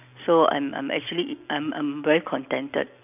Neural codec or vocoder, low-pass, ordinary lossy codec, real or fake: none; 3.6 kHz; none; real